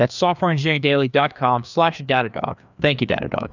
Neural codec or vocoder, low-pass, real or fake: codec, 16 kHz, 2 kbps, FreqCodec, larger model; 7.2 kHz; fake